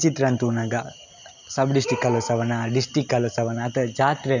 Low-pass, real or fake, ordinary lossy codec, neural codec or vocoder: 7.2 kHz; real; none; none